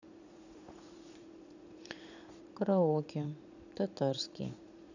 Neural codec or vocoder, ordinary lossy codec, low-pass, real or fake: vocoder, 44.1 kHz, 80 mel bands, Vocos; none; 7.2 kHz; fake